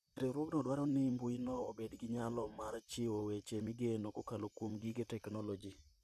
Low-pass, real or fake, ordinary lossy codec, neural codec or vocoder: 14.4 kHz; fake; none; vocoder, 44.1 kHz, 128 mel bands, Pupu-Vocoder